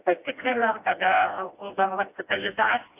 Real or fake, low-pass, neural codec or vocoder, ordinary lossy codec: fake; 3.6 kHz; codec, 16 kHz, 1 kbps, FreqCodec, smaller model; AAC, 32 kbps